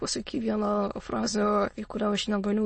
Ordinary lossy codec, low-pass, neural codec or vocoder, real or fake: MP3, 32 kbps; 9.9 kHz; autoencoder, 22.05 kHz, a latent of 192 numbers a frame, VITS, trained on many speakers; fake